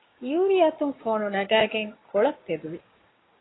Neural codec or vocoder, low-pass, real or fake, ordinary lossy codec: codec, 16 kHz, 6 kbps, DAC; 7.2 kHz; fake; AAC, 16 kbps